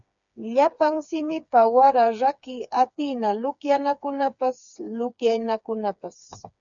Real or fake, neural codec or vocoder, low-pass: fake; codec, 16 kHz, 4 kbps, FreqCodec, smaller model; 7.2 kHz